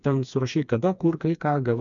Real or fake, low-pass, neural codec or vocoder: fake; 7.2 kHz; codec, 16 kHz, 2 kbps, FreqCodec, smaller model